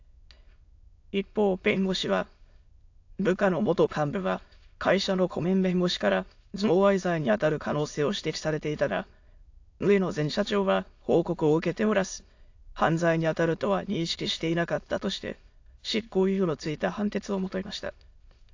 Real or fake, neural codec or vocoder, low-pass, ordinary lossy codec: fake; autoencoder, 22.05 kHz, a latent of 192 numbers a frame, VITS, trained on many speakers; 7.2 kHz; AAC, 48 kbps